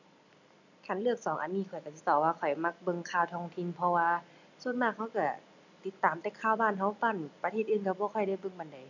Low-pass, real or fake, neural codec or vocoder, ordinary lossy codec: 7.2 kHz; real; none; none